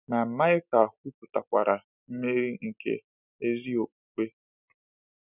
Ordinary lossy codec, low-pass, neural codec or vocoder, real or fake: none; 3.6 kHz; none; real